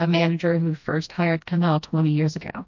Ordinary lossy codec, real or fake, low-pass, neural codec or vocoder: MP3, 48 kbps; fake; 7.2 kHz; codec, 16 kHz, 1 kbps, FreqCodec, smaller model